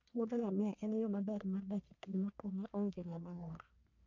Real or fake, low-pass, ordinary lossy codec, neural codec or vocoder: fake; 7.2 kHz; none; codec, 44.1 kHz, 1.7 kbps, Pupu-Codec